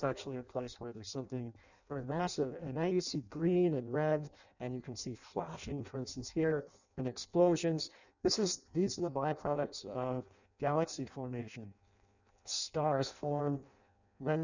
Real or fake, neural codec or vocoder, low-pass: fake; codec, 16 kHz in and 24 kHz out, 0.6 kbps, FireRedTTS-2 codec; 7.2 kHz